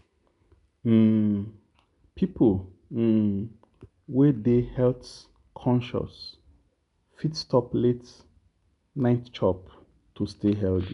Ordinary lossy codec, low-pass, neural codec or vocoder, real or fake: none; 10.8 kHz; none; real